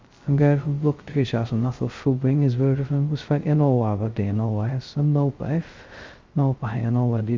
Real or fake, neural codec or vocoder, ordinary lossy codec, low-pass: fake; codec, 16 kHz, 0.2 kbps, FocalCodec; Opus, 32 kbps; 7.2 kHz